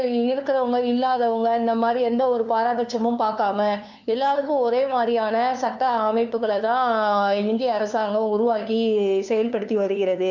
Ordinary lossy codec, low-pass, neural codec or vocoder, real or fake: none; 7.2 kHz; codec, 16 kHz, 2 kbps, FunCodec, trained on LibriTTS, 25 frames a second; fake